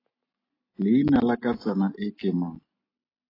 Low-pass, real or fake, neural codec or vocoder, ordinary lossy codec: 5.4 kHz; real; none; AAC, 24 kbps